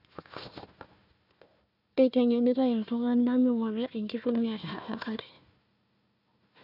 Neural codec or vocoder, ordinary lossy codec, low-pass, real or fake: codec, 16 kHz, 1 kbps, FunCodec, trained on Chinese and English, 50 frames a second; none; 5.4 kHz; fake